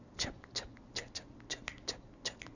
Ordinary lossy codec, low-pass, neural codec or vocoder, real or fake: none; 7.2 kHz; none; real